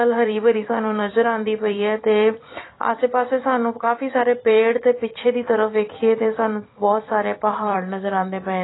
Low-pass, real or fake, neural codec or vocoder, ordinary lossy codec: 7.2 kHz; fake; vocoder, 44.1 kHz, 128 mel bands every 256 samples, BigVGAN v2; AAC, 16 kbps